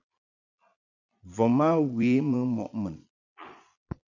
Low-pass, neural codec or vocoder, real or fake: 7.2 kHz; vocoder, 22.05 kHz, 80 mel bands, Vocos; fake